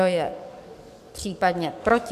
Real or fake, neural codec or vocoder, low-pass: fake; codec, 44.1 kHz, 7.8 kbps, DAC; 14.4 kHz